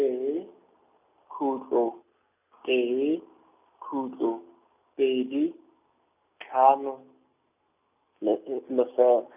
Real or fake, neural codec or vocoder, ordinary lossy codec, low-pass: real; none; none; 3.6 kHz